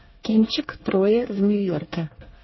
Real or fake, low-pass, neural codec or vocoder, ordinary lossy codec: fake; 7.2 kHz; codec, 24 kHz, 1 kbps, SNAC; MP3, 24 kbps